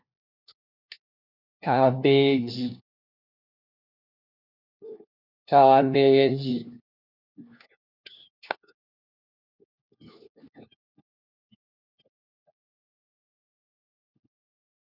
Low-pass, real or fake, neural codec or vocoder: 5.4 kHz; fake; codec, 16 kHz, 1 kbps, FunCodec, trained on LibriTTS, 50 frames a second